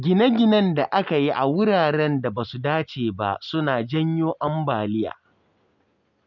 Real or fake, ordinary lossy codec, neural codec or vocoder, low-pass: real; none; none; 7.2 kHz